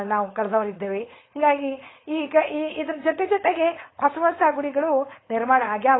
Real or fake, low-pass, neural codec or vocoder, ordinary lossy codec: fake; 7.2 kHz; codec, 16 kHz, 4.8 kbps, FACodec; AAC, 16 kbps